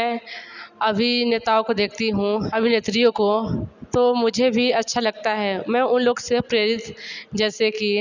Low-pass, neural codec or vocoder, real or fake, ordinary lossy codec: 7.2 kHz; none; real; none